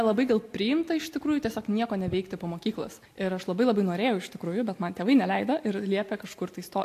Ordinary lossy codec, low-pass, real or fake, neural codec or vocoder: AAC, 64 kbps; 14.4 kHz; real; none